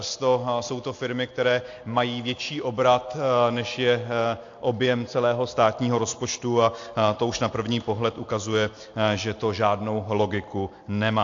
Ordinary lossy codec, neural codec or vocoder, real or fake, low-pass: AAC, 48 kbps; none; real; 7.2 kHz